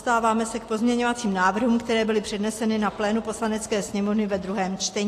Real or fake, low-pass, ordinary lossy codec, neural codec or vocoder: real; 14.4 kHz; AAC, 48 kbps; none